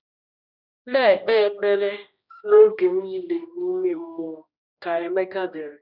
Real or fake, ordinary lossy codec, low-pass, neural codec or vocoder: fake; none; 5.4 kHz; codec, 16 kHz, 1 kbps, X-Codec, HuBERT features, trained on general audio